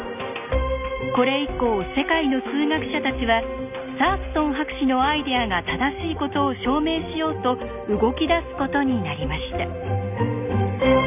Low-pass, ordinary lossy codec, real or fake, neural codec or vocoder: 3.6 kHz; none; real; none